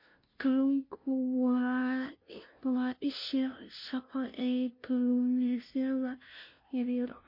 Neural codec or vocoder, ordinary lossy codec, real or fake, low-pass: codec, 16 kHz, 0.5 kbps, FunCodec, trained on LibriTTS, 25 frames a second; MP3, 32 kbps; fake; 5.4 kHz